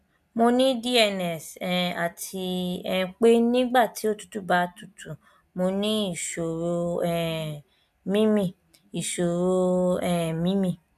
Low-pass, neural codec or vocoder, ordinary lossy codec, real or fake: 14.4 kHz; none; MP3, 96 kbps; real